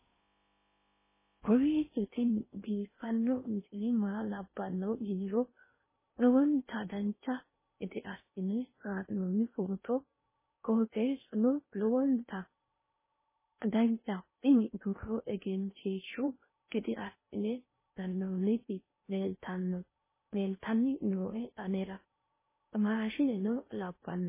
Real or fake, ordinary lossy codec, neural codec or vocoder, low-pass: fake; MP3, 16 kbps; codec, 16 kHz in and 24 kHz out, 0.6 kbps, FocalCodec, streaming, 4096 codes; 3.6 kHz